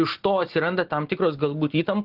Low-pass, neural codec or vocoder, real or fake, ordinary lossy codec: 5.4 kHz; none; real; Opus, 16 kbps